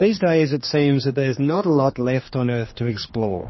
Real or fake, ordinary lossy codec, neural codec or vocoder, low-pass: fake; MP3, 24 kbps; codec, 16 kHz, 2 kbps, X-Codec, HuBERT features, trained on general audio; 7.2 kHz